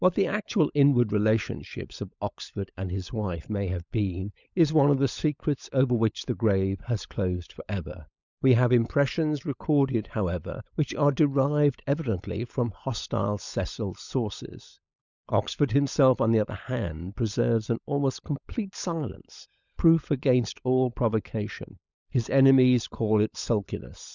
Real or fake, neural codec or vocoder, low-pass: fake; codec, 16 kHz, 16 kbps, FunCodec, trained on LibriTTS, 50 frames a second; 7.2 kHz